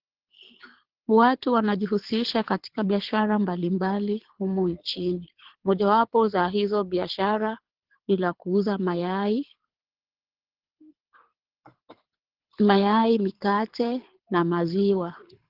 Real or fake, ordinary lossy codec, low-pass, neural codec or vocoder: fake; Opus, 16 kbps; 5.4 kHz; codec, 24 kHz, 6 kbps, HILCodec